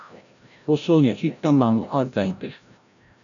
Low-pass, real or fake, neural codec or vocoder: 7.2 kHz; fake; codec, 16 kHz, 0.5 kbps, FreqCodec, larger model